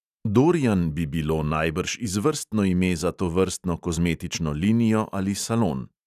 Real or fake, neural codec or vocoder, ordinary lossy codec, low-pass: real; none; none; 14.4 kHz